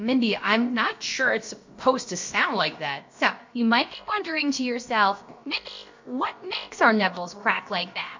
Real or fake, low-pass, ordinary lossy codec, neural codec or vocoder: fake; 7.2 kHz; MP3, 48 kbps; codec, 16 kHz, about 1 kbps, DyCAST, with the encoder's durations